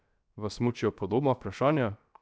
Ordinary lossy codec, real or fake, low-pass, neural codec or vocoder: none; fake; none; codec, 16 kHz, 0.7 kbps, FocalCodec